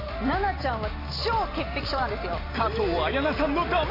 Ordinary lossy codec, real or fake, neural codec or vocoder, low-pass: AAC, 24 kbps; real; none; 5.4 kHz